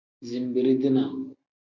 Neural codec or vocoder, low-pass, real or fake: none; 7.2 kHz; real